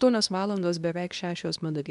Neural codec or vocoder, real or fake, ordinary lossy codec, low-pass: codec, 24 kHz, 0.9 kbps, WavTokenizer, medium speech release version 2; fake; MP3, 96 kbps; 10.8 kHz